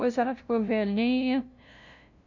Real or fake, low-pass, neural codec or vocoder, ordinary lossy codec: fake; 7.2 kHz; codec, 16 kHz, 0.5 kbps, FunCodec, trained on LibriTTS, 25 frames a second; none